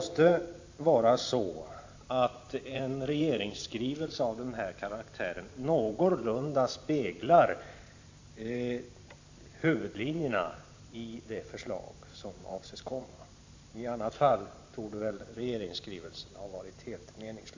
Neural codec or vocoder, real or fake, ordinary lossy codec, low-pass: vocoder, 44.1 kHz, 128 mel bands every 512 samples, BigVGAN v2; fake; AAC, 48 kbps; 7.2 kHz